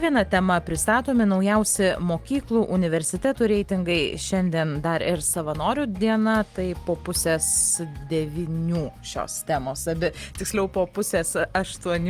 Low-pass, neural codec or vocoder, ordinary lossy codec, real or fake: 14.4 kHz; none; Opus, 24 kbps; real